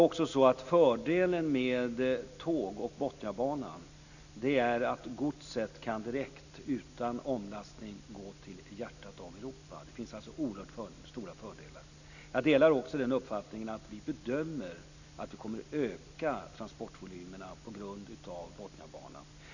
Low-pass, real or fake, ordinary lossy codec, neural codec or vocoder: 7.2 kHz; real; none; none